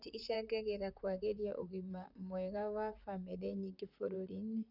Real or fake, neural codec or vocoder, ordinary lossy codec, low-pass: fake; vocoder, 44.1 kHz, 128 mel bands, Pupu-Vocoder; none; 5.4 kHz